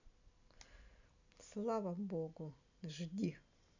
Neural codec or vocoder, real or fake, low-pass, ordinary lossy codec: vocoder, 44.1 kHz, 128 mel bands every 256 samples, BigVGAN v2; fake; 7.2 kHz; none